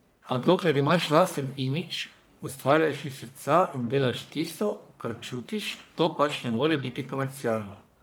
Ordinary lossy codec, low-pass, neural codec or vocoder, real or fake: none; none; codec, 44.1 kHz, 1.7 kbps, Pupu-Codec; fake